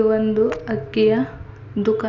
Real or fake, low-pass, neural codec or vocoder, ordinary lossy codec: real; 7.2 kHz; none; none